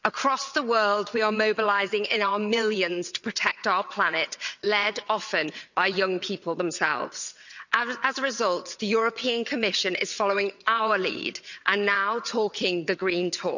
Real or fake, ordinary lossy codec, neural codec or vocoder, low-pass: fake; none; vocoder, 22.05 kHz, 80 mel bands, WaveNeXt; 7.2 kHz